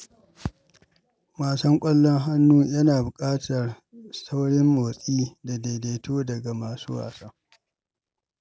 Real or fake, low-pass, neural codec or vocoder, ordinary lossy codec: real; none; none; none